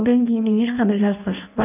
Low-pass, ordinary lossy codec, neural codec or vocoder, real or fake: 3.6 kHz; none; codec, 16 kHz in and 24 kHz out, 0.6 kbps, FireRedTTS-2 codec; fake